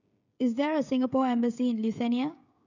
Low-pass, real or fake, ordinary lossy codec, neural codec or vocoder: 7.2 kHz; fake; none; codec, 16 kHz, 16 kbps, FreqCodec, smaller model